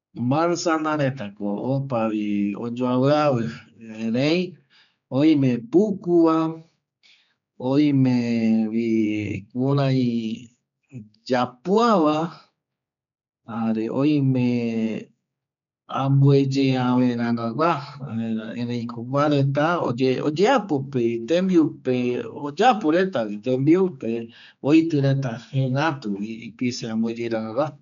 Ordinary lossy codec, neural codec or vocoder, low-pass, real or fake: none; codec, 16 kHz, 4 kbps, X-Codec, HuBERT features, trained on general audio; 7.2 kHz; fake